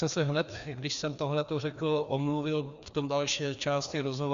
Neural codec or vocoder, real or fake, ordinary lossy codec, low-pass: codec, 16 kHz, 2 kbps, FreqCodec, larger model; fake; Opus, 64 kbps; 7.2 kHz